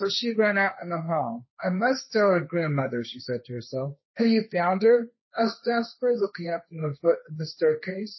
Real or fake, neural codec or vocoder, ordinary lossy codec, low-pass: fake; codec, 16 kHz, 1.1 kbps, Voila-Tokenizer; MP3, 24 kbps; 7.2 kHz